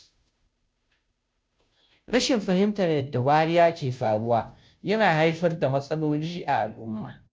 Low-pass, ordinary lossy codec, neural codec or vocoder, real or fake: none; none; codec, 16 kHz, 0.5 kbps, FunCodec, trained on Chinese and English, 25 frames a second; fake